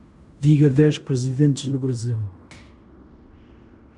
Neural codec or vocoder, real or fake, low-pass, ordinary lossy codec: codec, 16 kHz in and 24 kHz out, 0.9 kbps, LongCat-Audio-Codec, fine tuned four codebook decoder; fake; 10.8 kHz; Opus, 64 kbps